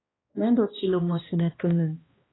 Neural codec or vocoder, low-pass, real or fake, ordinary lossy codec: codec, 16 kHz, 1 kbps, X-Codec, HuBERT features, trained on balanced general audio; 7.2 kHz; fake; AAC, 16 kbps